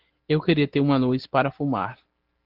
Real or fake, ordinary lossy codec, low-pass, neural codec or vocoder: real; Opus, 16 kbps; 5.4 kHz; none